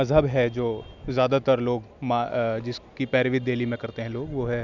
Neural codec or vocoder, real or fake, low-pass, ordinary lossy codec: none; real; 7.2 kHz; none